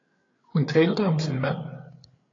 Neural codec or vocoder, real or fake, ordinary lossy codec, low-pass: codec, 16 kHz, 8 kbps, FreqCodec, larger model; fake; AAC, 32 kbps; 7.2 kHz